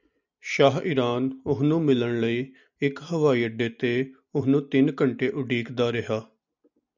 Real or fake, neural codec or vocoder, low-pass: real; none; 7.2 kHz